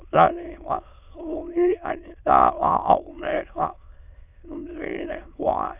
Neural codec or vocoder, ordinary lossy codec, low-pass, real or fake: autoencoder, 22.05 kHz, a latent of 192 numbers a frame, VITS, trained on many speakers; none; 3.6 kHz; fake